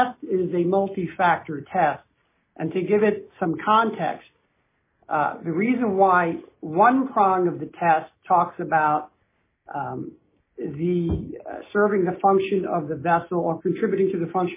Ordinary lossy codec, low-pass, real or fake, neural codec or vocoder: MP3, 16 kbps; 3.6 kHz; real; none